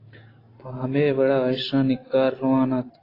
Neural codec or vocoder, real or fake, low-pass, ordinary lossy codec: none; real; 5.4 kHz; AAC, 24 kbps